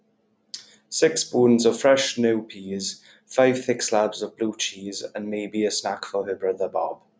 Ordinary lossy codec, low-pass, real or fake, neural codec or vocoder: none; none; real; none